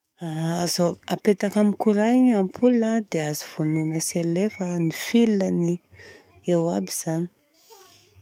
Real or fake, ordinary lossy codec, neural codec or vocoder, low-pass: fake; none; codec, 44.1 kHz, 7.8 kbps, DAC; 19.8 kHz